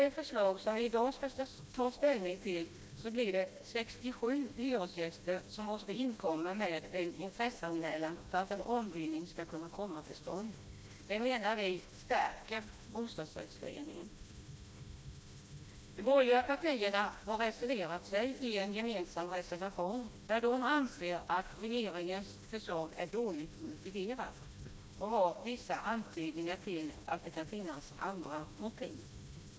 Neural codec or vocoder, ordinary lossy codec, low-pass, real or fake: codec, 16 kHz, 1 kbps, FreqCodec, smaller model; none; none; fake